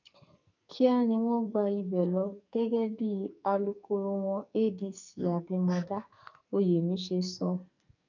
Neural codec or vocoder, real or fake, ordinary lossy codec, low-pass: codec, 44.1 kHz, 2.6 kbps, SNAC; fake; none; 7.2 kHz